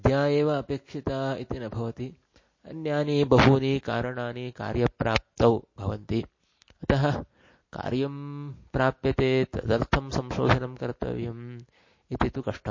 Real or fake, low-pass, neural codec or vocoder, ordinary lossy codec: real; 7.2 kHz; none; MP3, 32 kbps